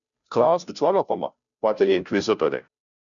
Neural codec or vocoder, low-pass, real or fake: codec, 16 kHz, 0.5 kbps, FunCodec, trained on Chinese and English, 25 frames a second; 7.2 kHz; fake